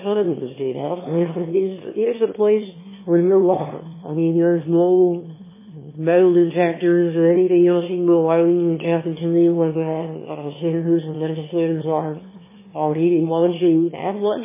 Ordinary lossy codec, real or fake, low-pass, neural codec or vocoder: MP3, 16 kbps; fake; 3.6 kHz; autoencoder, 22.05 kHz, a latent of 192 numbers a frame, VITS, trained on one speaker